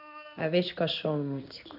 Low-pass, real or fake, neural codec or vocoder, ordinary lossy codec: 5.4 kHz; fake; codec, 16 kHz in and 24 kHz out, 1 kbps, XY-Tokenizer; none